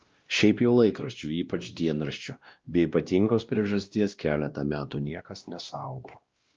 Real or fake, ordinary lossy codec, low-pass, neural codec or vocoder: fake; Opus, 24 kbps; 7.2 kHz; codec, 16 kHz, 1 kbps, X-Codec, WavLM features, trained on Multilingual LibriSpeech